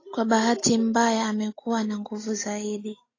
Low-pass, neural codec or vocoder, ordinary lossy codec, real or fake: 7.2 kHz; none; AAC, 32 kbps; real